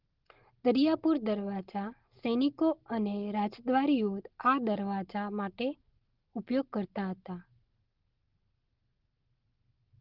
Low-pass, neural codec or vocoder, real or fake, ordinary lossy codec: 5.4 kHz; none; real; Opus, 16 kbps